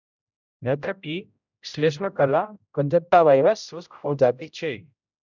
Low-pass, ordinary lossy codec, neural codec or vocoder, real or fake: 7.2 kHz; none; codec, 16 kHz, 0.5 kbps, X-Codec, HuBERT features, trained on general audio; fake